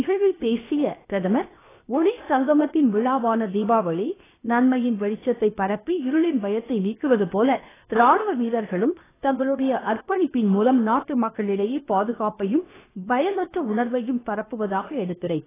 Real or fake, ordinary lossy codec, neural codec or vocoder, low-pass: fake; AAC, 16 kbps; codec, 16 kHz, 0.7 kbps, FocalCodec; 3.6 kHz